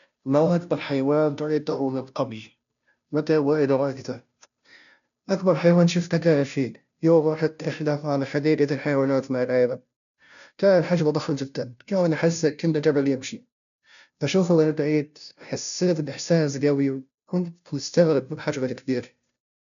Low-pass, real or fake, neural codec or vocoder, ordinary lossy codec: 7.2 kHz; fake; codec, 16 kHz, 0.5 kbps, FunCodec, trained on Chinese and English, 25 frames a second; none